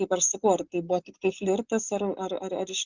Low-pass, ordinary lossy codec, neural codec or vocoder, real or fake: 7.2 kHz; Opus, 64 kbps; none; real